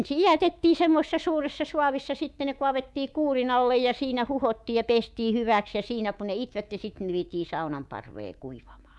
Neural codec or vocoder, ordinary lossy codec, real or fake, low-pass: codec, 24 kHz, 3.1 kbps, DualCodec; none; fake; none